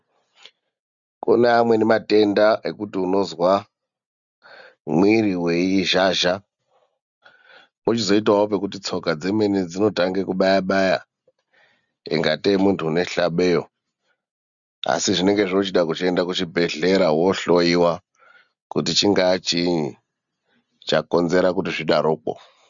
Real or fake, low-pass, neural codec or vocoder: real; 7.2 kHz; none